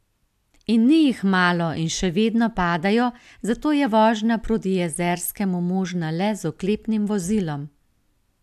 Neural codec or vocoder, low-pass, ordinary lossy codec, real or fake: none; 14.4 kHz; AAC, 96 kbps; real